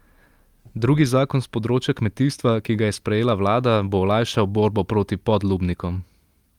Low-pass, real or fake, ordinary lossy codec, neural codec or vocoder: 19.8 kHz; real; Opus, 32 kbps; none